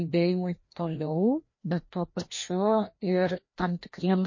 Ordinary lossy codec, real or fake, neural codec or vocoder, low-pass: MP3, 32 kbps; fake; codec, 16 kHz, 1 kbps, FreqCodec, larger model; 7.2 kHz